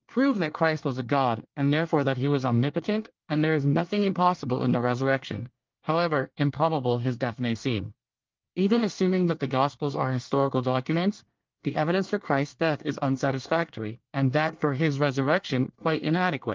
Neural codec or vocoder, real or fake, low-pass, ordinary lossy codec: codec, 24 kHz, 1 kbps, SNAC; fake; 7.2 kHz; Opus, 24 kbps